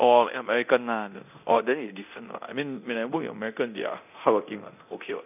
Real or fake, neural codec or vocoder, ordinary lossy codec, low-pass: fake; codec, 24 kHz, 0.9 kbps, DualCodec; none; 3.6 kHz